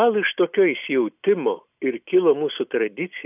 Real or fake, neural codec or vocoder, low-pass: real; none; 3.6 kHz